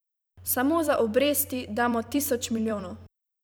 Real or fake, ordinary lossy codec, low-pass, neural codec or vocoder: fake; none; none; vocoder, 44.1 kHz, 128 mel bands every 256 samples, BigVGAN v2